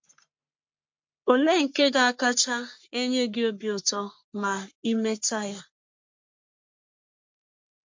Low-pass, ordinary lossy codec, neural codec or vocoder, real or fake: 7.2 kHz; MP3, 48 kbps; codec, 44.1 kHz, 3.4 kbps, Pupu-Codec; fake